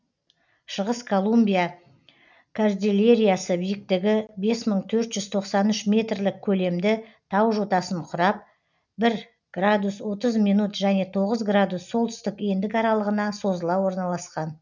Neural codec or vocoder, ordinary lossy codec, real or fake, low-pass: none; none; real; 7.2 kHz